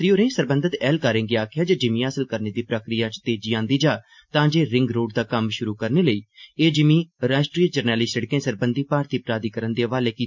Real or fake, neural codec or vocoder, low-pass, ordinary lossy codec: real; none; 7.2 kHz; MP3, 48 kbps